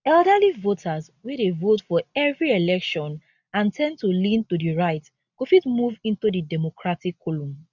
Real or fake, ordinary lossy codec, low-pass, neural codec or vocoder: real; none; 7.2 kHz; none